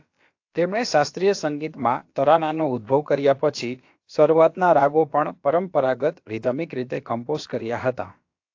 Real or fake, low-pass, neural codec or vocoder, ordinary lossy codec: fake; 7.2 kHz; codec, 16 kHz, about 1 kbps, DyCAST, with the encoder's durations; AAC, 48 kbps